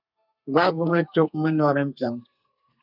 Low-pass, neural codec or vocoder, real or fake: 5.4 kHz; codec, 32 kHz, 1.9 kbps, SNAC; fake